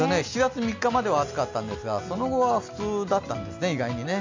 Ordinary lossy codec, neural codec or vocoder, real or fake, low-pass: MP3, 64 kbps; none; real; 7.2 kHz